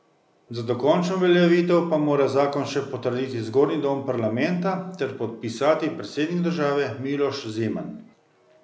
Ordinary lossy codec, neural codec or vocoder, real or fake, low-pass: none; none; real; none